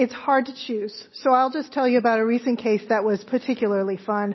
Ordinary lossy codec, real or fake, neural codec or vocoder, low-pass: MP3, 24 kbps; real; none; 7.2 kHz